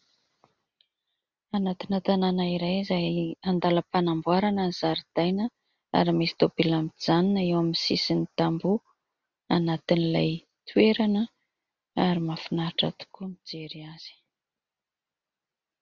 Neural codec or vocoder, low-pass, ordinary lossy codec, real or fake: none; 7.2 kHz; Opus, 64 kbps; real